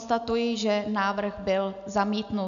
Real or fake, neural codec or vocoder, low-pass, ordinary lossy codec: real; none; 7.2 kHz; AAC, 96 kbps